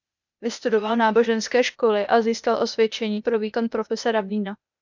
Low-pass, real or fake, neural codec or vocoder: 7.2 kHz; fake; codec, 16 kHz, 0.8 kbps, ZipCodec